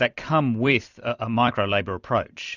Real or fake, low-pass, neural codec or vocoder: fake; 7.2 kHz; vocoder, 44.1 kHz, 128 mel bands every 256 samples, BigVGAN v2